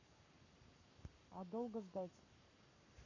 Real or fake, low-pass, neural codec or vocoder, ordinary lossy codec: fake; 7.2 kHz; codec, 44.1 kHz, 7.8 kbps, Pupu-Codec; none